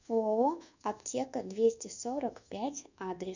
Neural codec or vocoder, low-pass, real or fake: codec, 24 kHz, 1.2 kbps, DualCodec; 7.2 kHz; fake